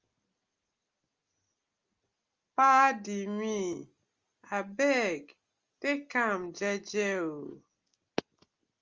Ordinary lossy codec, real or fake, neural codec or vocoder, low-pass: Opus, 32 kbps; real; none; 7.2 kHz